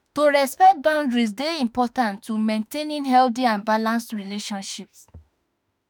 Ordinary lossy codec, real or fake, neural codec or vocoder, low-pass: none; fake; autoencoder, 48 kHz, 32 numbers a frame, DAC-VAE, trained on Japanese speech; none